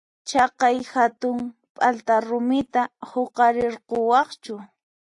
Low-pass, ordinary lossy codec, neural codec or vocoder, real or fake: 10.8 kHz; AAC, 64 kbps; none; real